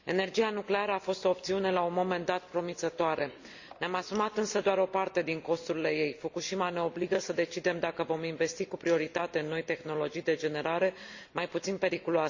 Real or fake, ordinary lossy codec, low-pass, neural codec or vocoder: real; Opus, 64 kbps; 7.2 kHz; none